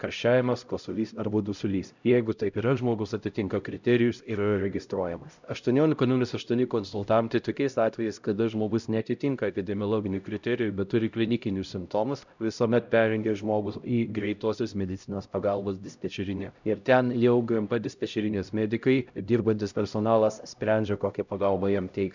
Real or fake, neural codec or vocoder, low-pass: fake; codec, 16 kHz, 0.5 kbps, X-Codec, HuBERT features, trained on LibriSpeech; 7.2 kHz